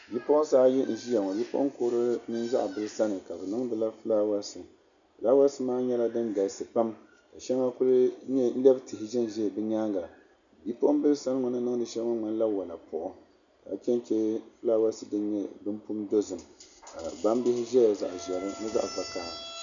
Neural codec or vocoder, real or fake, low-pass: none; real; 7.2 kHz